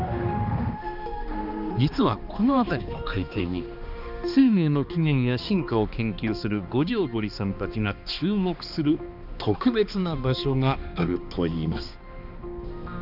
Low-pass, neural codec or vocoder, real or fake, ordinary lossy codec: 5.4 kHz; codec, 16 kHz, 2 kbps, X-Codec, HuBERT features, trained on balanced general audio; fake; none